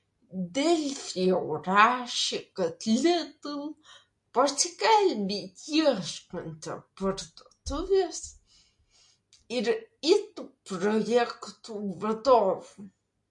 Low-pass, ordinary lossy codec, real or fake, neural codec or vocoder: 10.8 kHz; MP3, 48 kbps; real; none